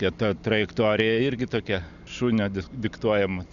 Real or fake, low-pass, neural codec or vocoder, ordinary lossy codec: real; 7.2 kHz; none; MP3, 96 kbps